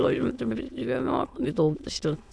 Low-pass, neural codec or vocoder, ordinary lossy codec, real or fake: none; autoencoder, 22.05 kHz, a latent of 192 numbers a frame, VITS, trained on many speakers; none; fake